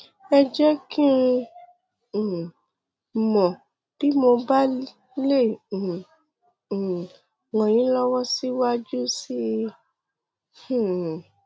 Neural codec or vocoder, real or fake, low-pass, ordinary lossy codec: none; real; none; none